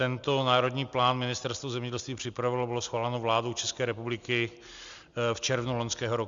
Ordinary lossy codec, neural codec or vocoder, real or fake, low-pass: Opus, 64 kbps; none; real; 7.2 kHz